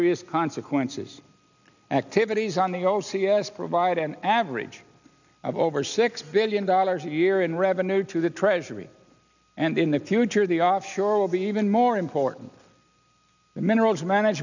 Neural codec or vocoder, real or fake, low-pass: none; real; 7.2 kHz